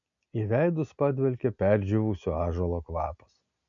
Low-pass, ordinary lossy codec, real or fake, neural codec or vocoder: 7.2 kHz; MP3, 96 kbps; real; none